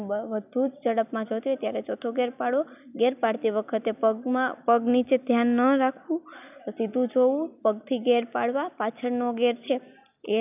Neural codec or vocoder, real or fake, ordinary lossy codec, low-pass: none; real; none; 3.6 kHz